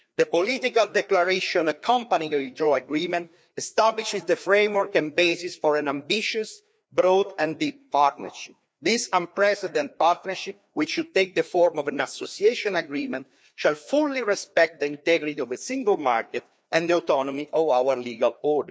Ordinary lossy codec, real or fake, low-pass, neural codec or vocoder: none; fake; none; codec, 16 kHz, 2 kbps, FreqCodec, larger model